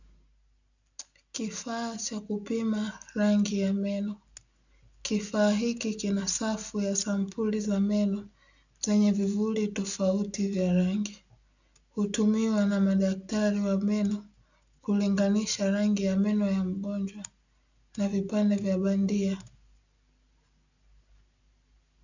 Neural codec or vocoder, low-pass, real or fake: none; 7.2 kHz; real